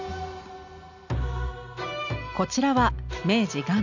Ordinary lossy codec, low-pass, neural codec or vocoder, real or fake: none; 7.2 kHz; none; real